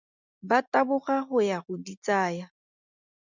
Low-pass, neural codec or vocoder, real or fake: 7.2 kHz; none; real